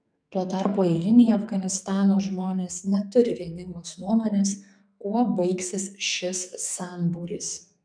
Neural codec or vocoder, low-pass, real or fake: codec, 44.1 kHz, 2.6 kbps, SNAC; 9.9 kHz; fake